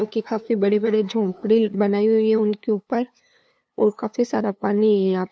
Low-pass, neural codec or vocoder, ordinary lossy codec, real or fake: none; codec, 16 kHz, 2 kbps, FunCodec, trained on LibriTTS, 25 frames a second; none; fake